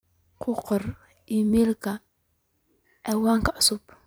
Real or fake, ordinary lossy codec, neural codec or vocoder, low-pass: fake; none; vocoder, 44.1 kHz, 128 mel bands every 256 samples, BigVGAN v2; none